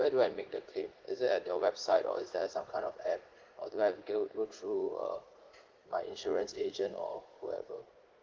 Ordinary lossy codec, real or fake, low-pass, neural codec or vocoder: Opus, 16 kbps; fake; 7.2 kHz; vocoder, 44.1 kHz, 80 mel bands, Vocos